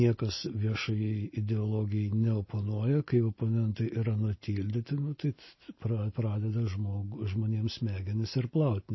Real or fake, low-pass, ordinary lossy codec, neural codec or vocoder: real; 7.2 kHz; MP3, 24 kbps; none